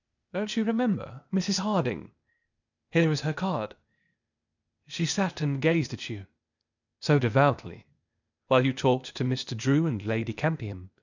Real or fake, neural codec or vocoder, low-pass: fake; codec, 16 kHz, 0.8 kbps, ZipCodec; 7.2 kHz